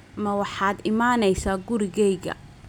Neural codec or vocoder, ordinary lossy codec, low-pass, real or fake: none; none; 19.8 kHz; real